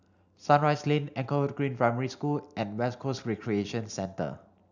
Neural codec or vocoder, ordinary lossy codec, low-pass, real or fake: none; none; 7.2 kHz; real